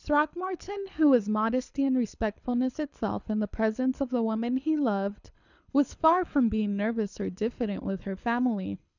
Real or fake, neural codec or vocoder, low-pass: fake; codec, 24 kHz, 6 kbps, HILCodec; 7.2 kHz